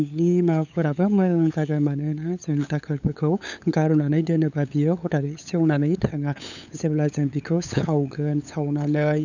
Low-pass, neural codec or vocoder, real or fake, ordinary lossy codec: 7.2 kHz; codec, 16 kHz, 8 kbps, FunCodec, trained on LibriTTS, 25 frames a second; fake; none